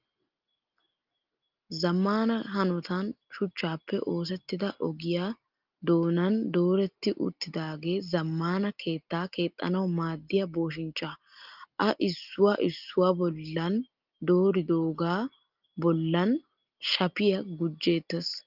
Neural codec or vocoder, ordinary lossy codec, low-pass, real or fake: none; Opus, 24 kbps; 5.4 kHz; real